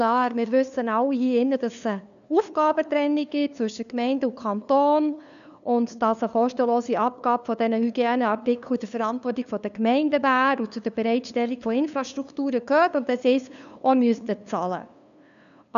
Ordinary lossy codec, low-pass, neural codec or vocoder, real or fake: none; 7.2 kHz; codec, 16 kHz, 2 kbps, FunCodec, trained on LibriTTS, 25 frames a second; fake